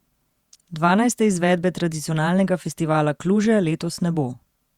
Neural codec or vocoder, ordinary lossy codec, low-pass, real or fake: vocoder, 48 kHz, 128 mel bands, Vocos; Opus, 64 kbps; 19.8 kHz; fake